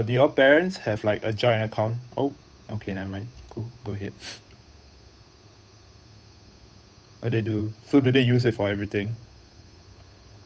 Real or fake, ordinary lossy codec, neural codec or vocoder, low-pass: fake; none; codec, 16 kHz, 8 kbps, FunCodec, trained on Chinese and English, 25 frames a second; none